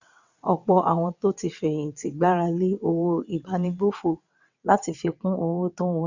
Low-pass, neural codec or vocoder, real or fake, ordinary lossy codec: 7.2 kHz; vocoder, 22.05 kHz, 80 mel bands, WaveNeXt; fake; none